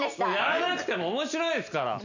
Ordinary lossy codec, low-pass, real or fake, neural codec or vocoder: none; 7.2 kHz; real; none